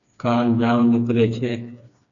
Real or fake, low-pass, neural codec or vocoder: fake; 7.2 kHz; codec, 16 kHz, 2 kbps, FreqCodec, smaller model